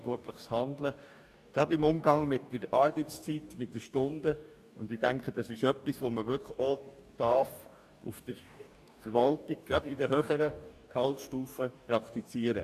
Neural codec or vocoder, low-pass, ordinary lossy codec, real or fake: codec, 44.1 kHz, 2.6 kbps, DAC; 14.4 kHz; AAC, 96 kbps; fake